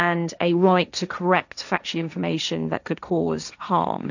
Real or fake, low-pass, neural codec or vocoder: fake; 7.2 kHz; codec, 16 kHz, 1.1 kbps, Voila-Tokenizer